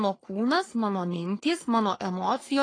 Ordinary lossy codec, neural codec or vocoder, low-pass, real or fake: AAC, 32 kbps; codec, 44.1 kHz, 3.4 kbps, Pupu-Codec; 9.9 kHz; fake